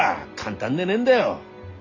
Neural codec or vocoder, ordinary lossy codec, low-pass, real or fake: none; Opus, 64 kbps; 7.2 kHz; real